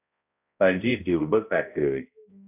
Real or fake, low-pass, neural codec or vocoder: fake; 3.6 kHz; codec, 16 kHz, 0.5 kbps, X-Codec, HuBERT features, trained on balanced general audio